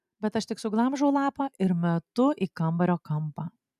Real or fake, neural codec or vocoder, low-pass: real; none; 14.4 kHz